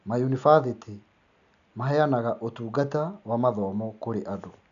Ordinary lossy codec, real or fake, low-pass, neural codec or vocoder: none; real; 7.2 kHz; none